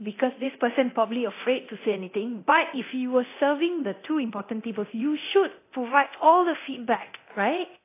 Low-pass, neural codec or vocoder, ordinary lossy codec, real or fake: 3.6 kHz; codec, 24 kHz, 0.9 kbps, DualCodec; AAC, 24 kbps; fake